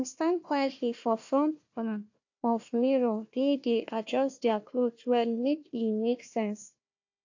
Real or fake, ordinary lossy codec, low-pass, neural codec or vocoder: fake; AAC, 48 kbps; 7.2 kHz; codec, 16 kHz, 1 kbps, FunCodec, trained on Chinese and English, 50 frames a second